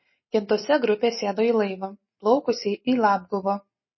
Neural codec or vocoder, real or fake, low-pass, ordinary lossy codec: none; real; 7.2 kHz; MP3, 24 kbps